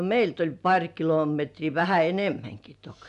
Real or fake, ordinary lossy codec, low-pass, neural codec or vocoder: real; none; 10.8 kHz; none